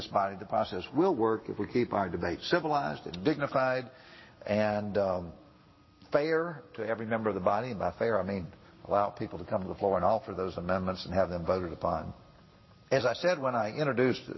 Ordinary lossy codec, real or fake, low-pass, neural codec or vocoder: MP3, 24 kbps; real; 7.2 kHz; none